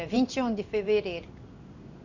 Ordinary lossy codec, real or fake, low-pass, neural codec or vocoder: none; fake; 7.2 kHz; codec, 16 kHz in and 24 kHz out, 1 kbps, XY-Tokenizer